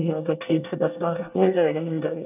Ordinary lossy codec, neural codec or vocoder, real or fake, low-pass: none; codec, 24 kHz, 1 kbps, SNAC; fake; 3.6 kHz